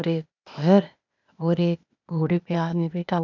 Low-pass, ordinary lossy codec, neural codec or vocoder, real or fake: 7.2 kHz; none; codec, 16 kHz, 0.8 kbps, ZipCodec; fake